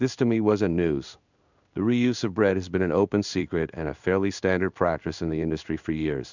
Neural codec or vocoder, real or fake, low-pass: codec, 16 kHz in and 24 kHz out, 1 kbps, XY-Tokenizer; fake; 7.2 kHz